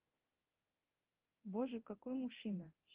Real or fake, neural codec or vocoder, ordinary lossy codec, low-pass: fake; codec, 24 kHz, 0.9 kbps, DualCodec; Opus, 16 kbps; 3.6 kHz